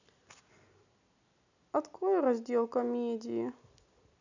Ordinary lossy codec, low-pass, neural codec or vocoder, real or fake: none; 7.2 kHz; none; real